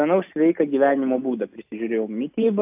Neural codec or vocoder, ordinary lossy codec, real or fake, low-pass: none; AAC, 32 kbps; real; 3.6 kHz